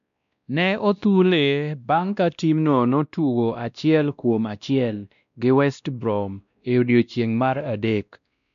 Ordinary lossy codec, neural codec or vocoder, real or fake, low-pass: none; codec, 16 kHz, 1 kbps, X-Codec, WavLM features, trained on Multilingual LibriSpeech; fake; 7.2 kHz